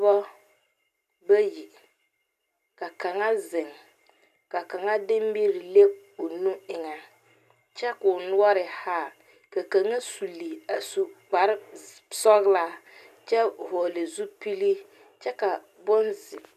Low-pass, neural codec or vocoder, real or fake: 14.4 kHz; none; real